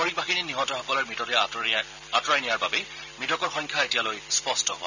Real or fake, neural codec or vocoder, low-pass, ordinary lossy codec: real; none; 7.2 kHz; none